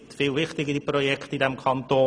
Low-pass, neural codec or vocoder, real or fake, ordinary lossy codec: none; none; real; none